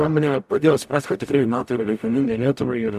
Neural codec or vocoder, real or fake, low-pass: codec, 44.1 kHz, 0.9 kbps, DAC; fake; 14.4 kHz